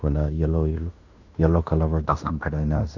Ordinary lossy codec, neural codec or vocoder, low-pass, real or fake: none; codec, 16 kHz in and 24 kHz out, 0.9 kbps, LongCat-Audio-Codec, fine tuned four codebook decoder; 7.2 kHz; fake